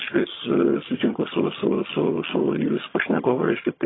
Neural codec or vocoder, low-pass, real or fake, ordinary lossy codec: vocoder, 22.05 kHz, 80 mel bands, HiFi-GAN; 7.2 kHz; fake; AAC, 16 kbps